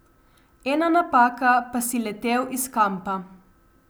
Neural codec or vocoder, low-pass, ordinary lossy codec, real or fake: none; none; none; real